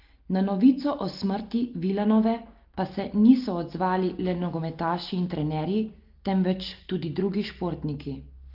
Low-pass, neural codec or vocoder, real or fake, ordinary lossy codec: 5.4 kHz; none; real; Opus, 32 kbps